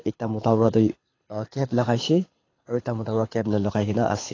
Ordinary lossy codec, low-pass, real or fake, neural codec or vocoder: AAC, 32 kbps; 7.2 kHz; fake; codec, 24 kHz, 6 kbps, HILCodec